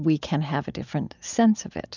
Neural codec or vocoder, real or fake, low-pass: none; real; 7.2 kHz